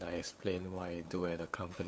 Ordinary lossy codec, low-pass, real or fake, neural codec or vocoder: none; none; fake; codec, 16 kHz, 8 kbps, FunCodec, trained on LibriTTS, 25 frames a second